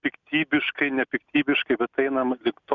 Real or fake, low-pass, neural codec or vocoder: real; 7.2 kHz; none